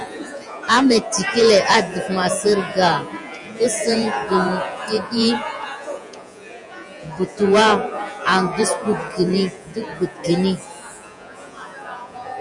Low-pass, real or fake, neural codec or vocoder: 10.8 kHz; fake; vocoder, 48 kHz, 128 mel bands, Vocos